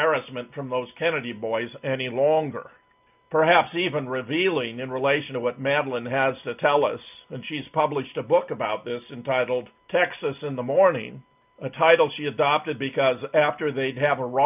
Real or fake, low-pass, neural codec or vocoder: real; 3.6 kHz; none